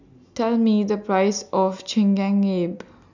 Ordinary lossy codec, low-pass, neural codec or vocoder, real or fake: none; 7.2 kHz; none; real